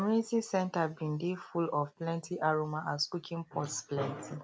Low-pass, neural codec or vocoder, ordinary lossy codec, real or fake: none; none; none; real